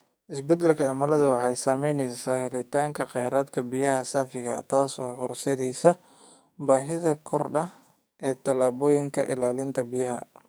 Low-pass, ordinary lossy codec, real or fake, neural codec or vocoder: none; none; fake; codec, 44.1 kHz, 2.6 kbps, SNAC